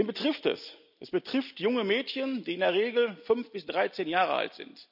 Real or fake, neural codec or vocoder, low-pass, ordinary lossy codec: real; none; 5.4 kHz; none